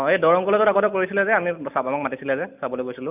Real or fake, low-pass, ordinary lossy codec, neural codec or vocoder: real; 3.6 kHz; none; none